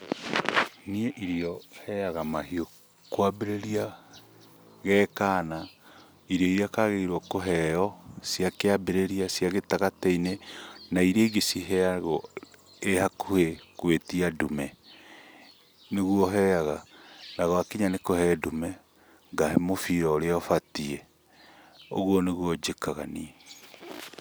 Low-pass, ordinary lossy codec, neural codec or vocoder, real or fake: none; none; none; real